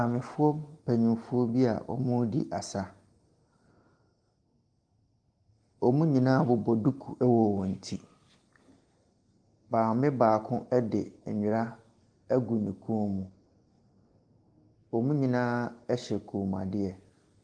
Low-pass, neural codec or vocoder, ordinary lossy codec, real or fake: 9.9 kHz; none; Opus, 24 kbps; real